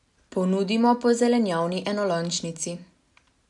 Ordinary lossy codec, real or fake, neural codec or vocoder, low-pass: none; real; none; 10.8 kHz